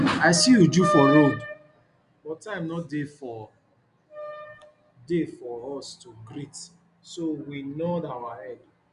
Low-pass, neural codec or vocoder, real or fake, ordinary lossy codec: 10.8 kHz; none; real; none